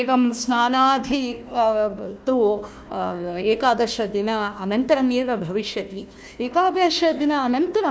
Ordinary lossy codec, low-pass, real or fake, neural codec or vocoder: none; none; fake; codec, 16 kHz, 1 kbps, FunCodec, trained on Chinese and English, 50 frames a second